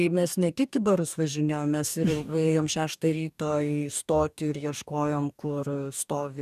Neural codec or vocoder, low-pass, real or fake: codec, 44.1 kHz, 2.6 kbps, DAC; 14.4 kHz; fake